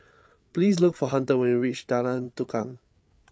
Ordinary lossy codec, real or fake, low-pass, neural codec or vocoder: none; fake; none; codec, 16 kHz, 8 kbps, FreqCodec, larger model